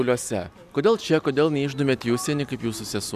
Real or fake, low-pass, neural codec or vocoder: real; 14.4 kHz; none